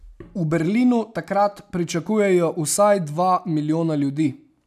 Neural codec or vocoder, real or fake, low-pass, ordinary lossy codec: none; real; 14.4 kHz; none